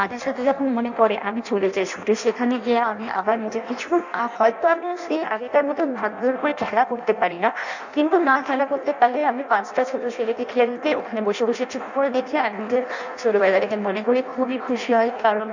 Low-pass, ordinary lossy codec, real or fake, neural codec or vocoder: 7.2 kHz; none; fake; codec, 16 kHz in and 24 kHz out, 0.6 kbps, FireRedTTS-2 codec